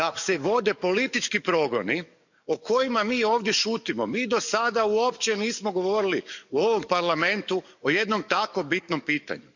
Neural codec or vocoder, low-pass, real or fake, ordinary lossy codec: codec, 44.1 kHz, 7.8 kbps, DAC; 7.2 kHz; fake; none